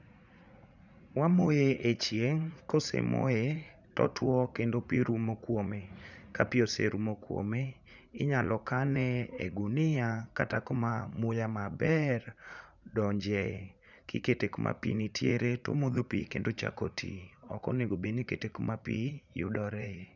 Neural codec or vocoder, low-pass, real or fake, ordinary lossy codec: vocoder, 44.1 kHz, 80 mel bands, Vocos; 7.2 kHz; fake; none